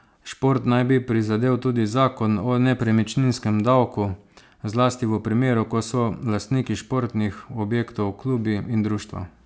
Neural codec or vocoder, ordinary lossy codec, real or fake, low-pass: none; none; real; none